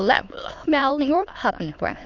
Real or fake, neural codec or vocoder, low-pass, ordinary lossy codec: fake; autoencoder, 22.05 kHz, a latent of 192 numbers a frame, VITS, trained on many speakers; 7.2 kHz; MP3, 48 kbps